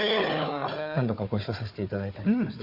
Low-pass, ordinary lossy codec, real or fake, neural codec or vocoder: 5.4 kHz; MP3, 32 kbps; fake; codec, 16 kHz, 4 kbps, FunCodec, trained on Chinese and English, 50 frames a second